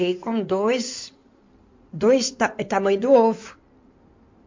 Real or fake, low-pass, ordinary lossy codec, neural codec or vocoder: fake; 7.2 kHz; MP3, 48 kbps; codec, 16 kHz in and 24 kHz out, 2.2 kbps, FireRedTTS-2 codec